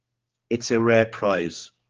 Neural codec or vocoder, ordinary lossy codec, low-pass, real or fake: codec, 16 kHz, 2 kbps, X-Codec, HuBERT features, trained on general audio; Opus, 16 kbps; 7.2 kHz; fake